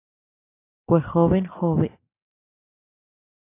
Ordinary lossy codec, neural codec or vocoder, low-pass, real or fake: AAC, 24 kbps; none; 3.6 kHz; real